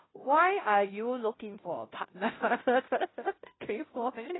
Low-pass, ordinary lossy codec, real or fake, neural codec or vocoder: 7.2 kHz; AAC, 16 kbps; fake; codec, 16 kHz, 0.5 kbps, FunCodec, trained on Chinese and English, 25 frames a second